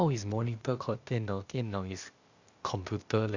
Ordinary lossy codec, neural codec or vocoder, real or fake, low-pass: Opus, 64 kbps; codec, 16 kHz, 0.8 kbps, ZipCodec; fake; 7.2 kHz